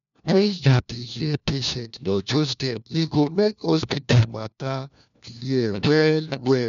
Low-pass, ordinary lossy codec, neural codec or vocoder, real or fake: 7.2 kHz; none; codec, 16 kHz, 1 kbps, FunCodec, trained on LibriTTS, 50 frames a second; fake